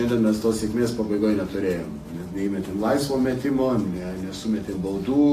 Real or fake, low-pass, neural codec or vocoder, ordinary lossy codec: real; 14.4 kHz; none; AAC, 48 kbps